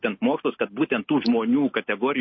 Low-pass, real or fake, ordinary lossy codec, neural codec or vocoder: 7.2 kHz; real; MP3, 24 kbps; none